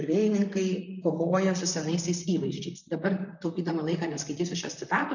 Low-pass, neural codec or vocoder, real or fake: 7.2 kHz; vocoder, 44.1 kHz, 128 mel bands, Pupu-Vocoder; fake